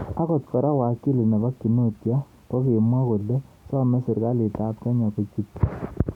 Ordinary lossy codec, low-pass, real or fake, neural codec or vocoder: none; 19.8 kHz; real; none